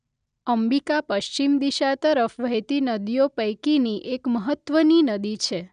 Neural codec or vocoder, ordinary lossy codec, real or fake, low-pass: none; none; real; 9.9 kHz